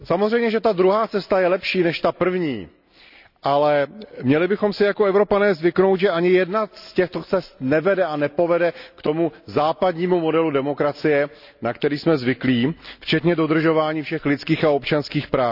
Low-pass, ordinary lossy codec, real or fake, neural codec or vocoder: 5.4 kHz; none; real; none